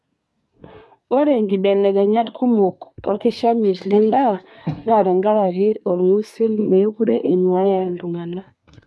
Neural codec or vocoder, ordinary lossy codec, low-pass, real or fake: codec, 24 kHz, 1 kbps, SNAC; none; none; fake